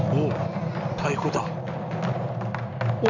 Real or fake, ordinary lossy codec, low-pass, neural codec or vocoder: real; none; 7.2 kHz; none